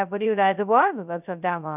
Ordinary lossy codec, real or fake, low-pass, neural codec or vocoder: none; fake; 3.6 kHz; codec, 16 kHz, 0.2 kbps, FocalCodec